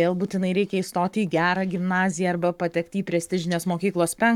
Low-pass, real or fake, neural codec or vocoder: 19.8 kHz; fake; codec, 44.1 kHz, 7.8 kbps, Pupu-Codec